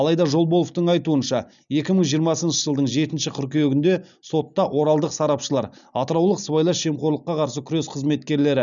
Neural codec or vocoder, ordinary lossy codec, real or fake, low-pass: none; none; real; 7.2 kHz